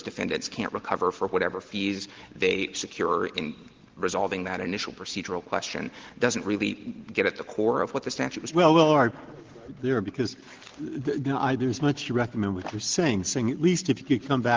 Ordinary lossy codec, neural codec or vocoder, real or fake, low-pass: Opus, 16 kbps; none; real; 7.2 kHz